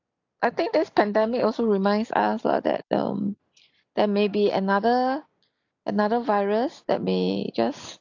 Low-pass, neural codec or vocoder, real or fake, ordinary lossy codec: 7.2 kHz; none; real; AAC, 48 kbps